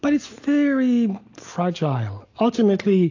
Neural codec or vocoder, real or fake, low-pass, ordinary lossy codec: none; real; 7.2 kHz; AAC, 48 kbps